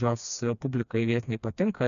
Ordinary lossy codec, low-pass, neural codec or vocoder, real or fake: MP3, 96 kbps; 7.2 kHz; codec, 16 kHz, 2 kbps, FreqCodec, smaller model; fake